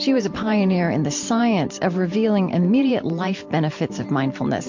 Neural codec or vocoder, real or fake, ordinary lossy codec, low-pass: vocoder, 44.1 kHz, 128 mel bands every 512 samples, BigVGAN v2; fake; MP3, 48 kbps; 7.2 kHz